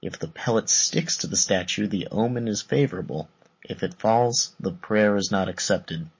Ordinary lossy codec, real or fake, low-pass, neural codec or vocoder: MP3, 32 kbps; real; 7.2 kHz; none